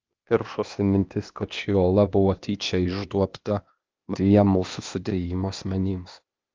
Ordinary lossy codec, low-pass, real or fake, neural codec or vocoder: Opus, 24 kbps; 7.2 kHz; fake; codec, 16 kHz, 0.8 kbps, ZipCodec